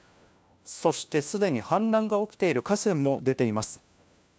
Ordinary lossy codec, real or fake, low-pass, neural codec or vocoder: none; fake; none; codec, 16 kHz, 1 kbps, FunCodec, trained on LibriTTS, 50 frames a second